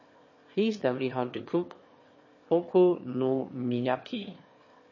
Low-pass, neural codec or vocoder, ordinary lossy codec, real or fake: 7.2 kHz; autoencoder, 22.05 kHz, a latent of 192 numbers a frame, VITS, trained on one speaker; MP3, 32 kbps; fake